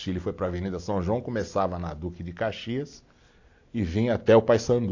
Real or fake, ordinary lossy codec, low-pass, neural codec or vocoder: real; AAC, 48 kbps; 7.2 kHz; none